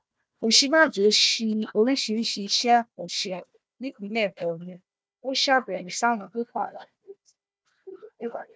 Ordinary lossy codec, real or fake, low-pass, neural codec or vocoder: none; fake; none; codec, 16 kHz, 1 kbps, FunCodec, trained on Chinese and English, 50 frames a second